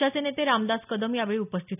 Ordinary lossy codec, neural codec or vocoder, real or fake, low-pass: none; none; real; 3.6 kHz